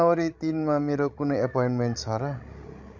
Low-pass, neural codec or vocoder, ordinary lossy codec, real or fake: 7.2 kHz; codec, 16 kHz, 16 kbps, FreqCodec, larger model; none; fake